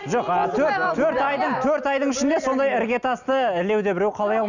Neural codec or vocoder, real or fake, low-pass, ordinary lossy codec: none; real; 7.2 kHz; none